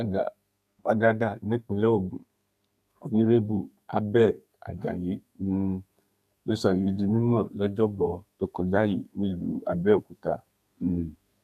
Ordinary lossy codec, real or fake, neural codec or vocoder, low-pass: none; fake; codec, 32 kHz, 1.9 kbps, SNAC; 14.4 kHz